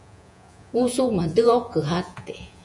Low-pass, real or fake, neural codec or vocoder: 10.8 kHz; fake; vocoder, 48 kHz, 128 mel bands, Vocos